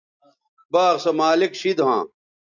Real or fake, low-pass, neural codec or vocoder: real; 7.2 kHz; none